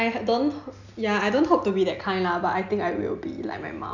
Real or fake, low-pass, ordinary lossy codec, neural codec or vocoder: real; 7.2 kHz; none; none